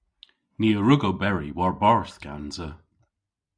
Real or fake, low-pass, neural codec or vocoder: real; 9.9 kHz; none